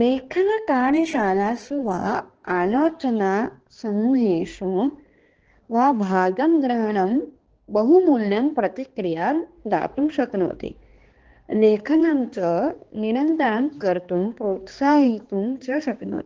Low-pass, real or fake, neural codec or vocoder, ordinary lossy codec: 7.2 kHz; fake; codec, 16 kHz, 2 kbps, X-Codec, HuBERT features, trained on balanced general audio; Opus, 16 kbps